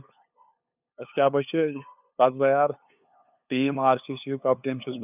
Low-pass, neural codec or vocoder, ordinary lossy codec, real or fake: 3.6 kHz; codec, 16 kHz, 2 kbps, FunCodec, trained on LibriTTS, 25 frames a second; none; fake